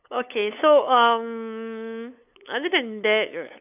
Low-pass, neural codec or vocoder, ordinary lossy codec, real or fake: 3.6 kHz; codec, 16 kHz, 8 kbps, FunCodec, trained on LibriTTS, 25 frames a second; none; fake